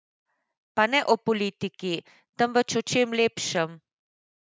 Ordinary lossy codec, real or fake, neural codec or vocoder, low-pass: none; real; none; none